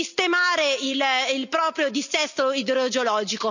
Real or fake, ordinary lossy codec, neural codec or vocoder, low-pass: real; none; none; 7.2 kHz